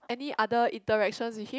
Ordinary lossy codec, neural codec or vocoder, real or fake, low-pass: none; none; real; none